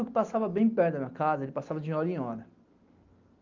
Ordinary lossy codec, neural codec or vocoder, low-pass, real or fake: Opus, 32 kbps; none; 7.2 kHz; real